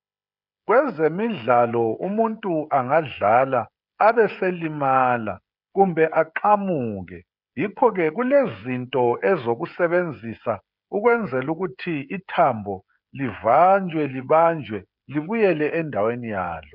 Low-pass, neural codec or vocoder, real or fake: 5.4 kHz; codec, 16 kHz, 16 kbps, FreqCodec, smaller model; fake